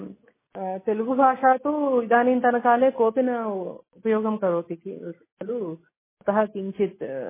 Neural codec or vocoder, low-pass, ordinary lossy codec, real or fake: none; 3.6 kHz; MP3, 16 kbps; real